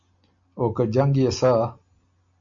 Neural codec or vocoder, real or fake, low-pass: none; real; 7.2 kHz